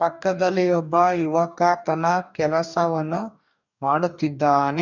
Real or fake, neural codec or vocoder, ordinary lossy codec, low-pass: fake; codec, 44.1 kHz, 2.6 kbps, DAC; none; 7.2 kHz